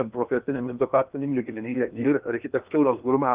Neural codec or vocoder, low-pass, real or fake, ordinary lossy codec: codec, 16 kHz in and 24 kHz out, 0.8 kbps, FocalCodec, streaming, 65536 codes; 3.6 kHz; fake; Opus, 32 kbps